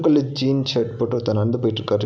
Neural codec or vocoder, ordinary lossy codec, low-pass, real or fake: none; none; none; real